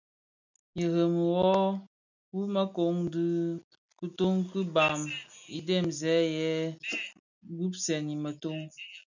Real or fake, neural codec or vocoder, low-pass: real; none; 7.2 kHz